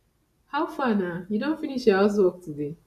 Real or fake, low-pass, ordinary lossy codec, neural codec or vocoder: real; 14.4 kHz; none; none